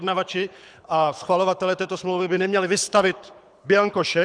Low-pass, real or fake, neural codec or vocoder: 9.9 kHz; fake; codec, 24 kHz, 6 kbps, HILCodec